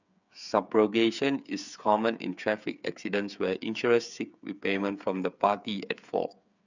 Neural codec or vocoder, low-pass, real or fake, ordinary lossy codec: codec, 16 kHz, 8 kbps, FreqCodec, smaller model; 7.2 kHz; fake; none